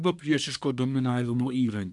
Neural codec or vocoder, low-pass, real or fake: codec, 24 kHz, 1 kbps, SNAC; 10.8 kHz; fake